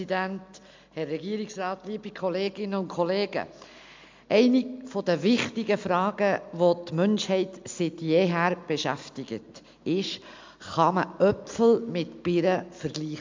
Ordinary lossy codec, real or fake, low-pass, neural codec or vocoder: MP3, 64 kbps; real; 7.2 kHz; none